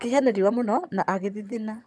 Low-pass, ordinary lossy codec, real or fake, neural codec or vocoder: none; none; fake; vocoder, 22.05 kHz, 80 mel bands, HiFi-GAN